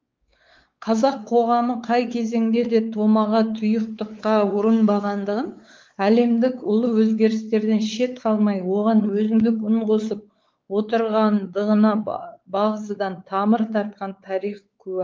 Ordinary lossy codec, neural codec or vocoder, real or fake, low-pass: Opus, 32 kbps; codec, 16 kHz, 4 kbps, X-Codec, WavLM features, trained on Multilingual LibriSpeech; fake; 7.2 kHz